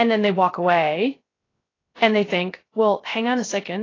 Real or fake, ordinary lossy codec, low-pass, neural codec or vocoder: fake; AAC, 32 kbps; 7.2 kHz; codec, 16 kHz, 0.3 kbps, FocalCodec